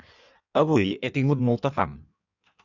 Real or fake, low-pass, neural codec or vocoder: fake; 7.2 kHz; codec, 16 kHz in and 24 kHz out, 1.1 kbps, FireRedTTS-2 codec